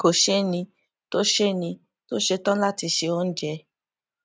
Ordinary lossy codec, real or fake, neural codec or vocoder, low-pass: none; real; none; none